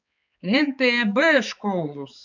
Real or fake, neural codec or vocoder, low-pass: fake; codec, 16 kHz, 4 kbps, X-Codec, HuBERT features, trained on balanced general audio; 7.2 kHz